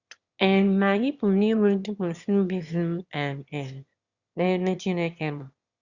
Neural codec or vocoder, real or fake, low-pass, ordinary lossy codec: autoencoder, 22.05 kHz, a latent of 192 numbers a frame, VITS, trained on one speaker; fake; 7.2 kHz; Opus, 64 kbps